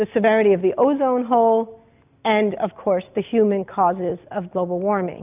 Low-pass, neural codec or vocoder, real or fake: 3.6 kHz; none; real